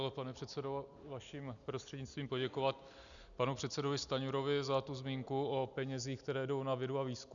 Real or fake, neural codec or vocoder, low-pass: real; none; 7.2 kHz